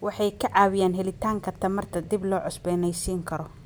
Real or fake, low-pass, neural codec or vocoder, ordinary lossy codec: real; none; none; none